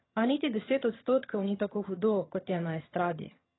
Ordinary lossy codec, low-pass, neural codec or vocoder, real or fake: AAC, 16 kbps; 7.2 kHz; vocoder, 22.05 kHz, 80 mel bands, HiFi-GAN; fake